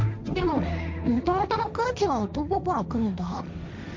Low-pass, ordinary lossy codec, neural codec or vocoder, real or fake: none; none; codec, 16 kHz, 1.1 kbps, Voila-Tokenizer; fake